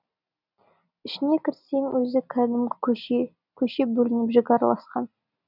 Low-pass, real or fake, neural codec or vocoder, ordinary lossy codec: 5.4 kHz; real; none; none